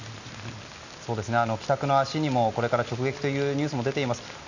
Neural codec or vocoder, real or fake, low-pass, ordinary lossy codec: none; real; 7.2 kHz; none